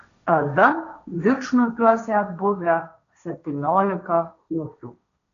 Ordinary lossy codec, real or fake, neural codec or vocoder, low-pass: AAC, 96 kbps; fake; codec, 16 kHz, 1.1 kbps, Voila-Tokenizer; 7.2 kHz